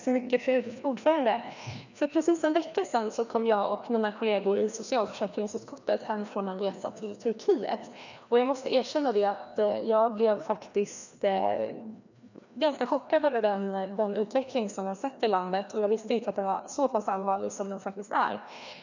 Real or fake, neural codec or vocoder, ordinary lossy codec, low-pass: fake; codec, 16 kHz, 1 kbps, FreqCodec, larger model; none; 7.2 kHz